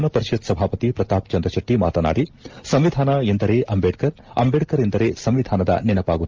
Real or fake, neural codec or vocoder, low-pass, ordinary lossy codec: real; none; 7.2 kHz; Opus, 24 kbps